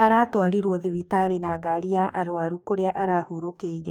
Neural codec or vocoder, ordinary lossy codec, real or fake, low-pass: codec, 44.1 kHz, 2.6 kbps, DAC; none; fake; 19.8 kHz